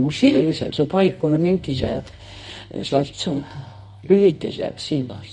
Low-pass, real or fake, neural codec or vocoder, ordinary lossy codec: 10.8 kHz; fake; codec, 24 kHz, 0.9 kbps, WavTokenizer, medium music audio release; MP3, 48 kbps